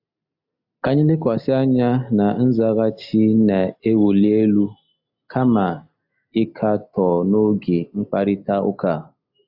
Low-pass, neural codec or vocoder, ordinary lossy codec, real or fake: 5.4 kHz; none; AAC, 48 kbps; real